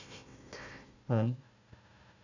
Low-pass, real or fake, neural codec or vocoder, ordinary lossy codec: 7.2 kHz; fake; codec, 16 kHz, 1 kbps, FunCodec, trained on Chinese and English, 50 frames a second; none